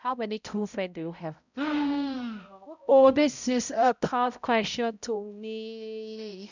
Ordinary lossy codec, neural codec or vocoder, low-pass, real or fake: none; codec, 16 kHz, 0.5 kbps, X-Codec, HuBERT features, trained on balanced general audio; 7.2 kHz; fake